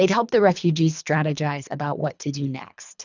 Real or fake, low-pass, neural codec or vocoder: fake; 7.2 kHz; codec, 24 kHz, 3 kbps, HILCodec